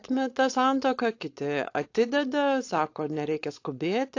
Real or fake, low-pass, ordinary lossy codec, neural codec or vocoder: fake; 7.2 kHz; AAC, 48 kbps; codec, 16 kHz, 4.8 kbps, FACodec